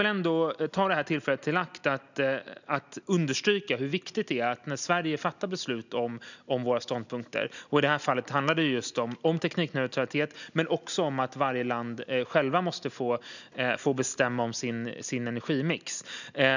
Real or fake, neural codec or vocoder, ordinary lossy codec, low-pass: real; none; none; 7.2 kHz